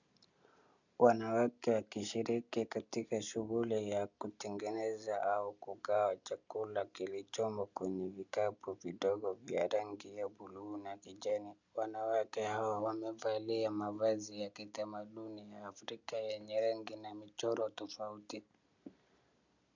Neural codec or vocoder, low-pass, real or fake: none; 7.2 kHz; real